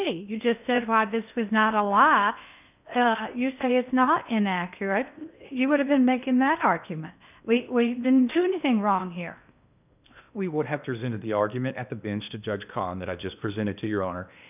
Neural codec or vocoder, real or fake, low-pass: codec, 16 kHz in and 24 kHz out, 0.8 kbps, FocalCodec, streaming, 65536 codes; fake; 3.6 kHz